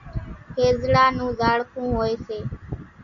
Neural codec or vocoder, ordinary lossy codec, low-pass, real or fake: none; MP3, 96 kbps; 7.2 kHz; real